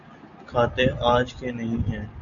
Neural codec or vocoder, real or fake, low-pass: none; real; 7.2 kHz